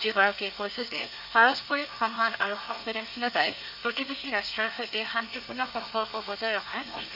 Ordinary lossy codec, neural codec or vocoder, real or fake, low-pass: none; codec, 24 kHz, 1 kbps, SNAC; fake; 5.4 kHz